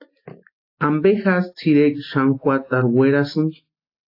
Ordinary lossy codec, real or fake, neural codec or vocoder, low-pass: AAC, 32 kbps; real; none; 5.4 kHz